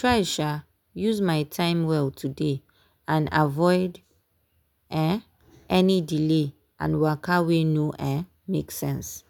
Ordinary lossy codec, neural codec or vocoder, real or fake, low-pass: none; none; real; 19.8 kHz